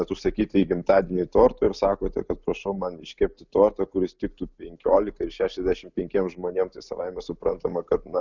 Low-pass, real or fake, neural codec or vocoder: 7.2 kHz; real; none